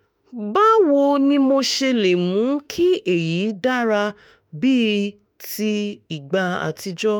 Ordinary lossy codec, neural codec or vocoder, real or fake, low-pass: none; autoencoder, 48 kHz, 32 numbers a frame, DAC-VAE, trained on Japanese speech; fake; none